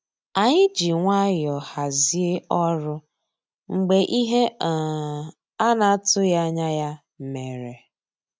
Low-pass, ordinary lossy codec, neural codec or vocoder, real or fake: none; none; none; real